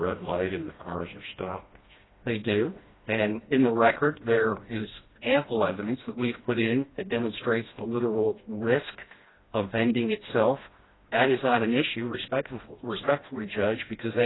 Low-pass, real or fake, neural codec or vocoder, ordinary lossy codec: 7.2 kHz; fake; codec, 16 kHz, 1 kbps, FreqCodec, smaller model; AAC, 16 kbps